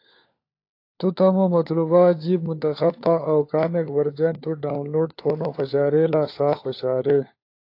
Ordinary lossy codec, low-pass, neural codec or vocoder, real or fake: AAC, 32 kbps; 5.4 kHz; codec, 16 kHz, 4 kbps, FunCodec, trained on LibriTTS, 50 frames a second; fake